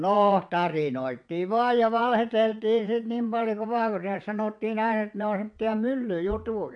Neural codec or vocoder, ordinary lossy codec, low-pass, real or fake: vocoder, 22.05 kHz, 80 mel bands, WaveNeXt; AAC, 64 kbps; 9.9 kHz; fake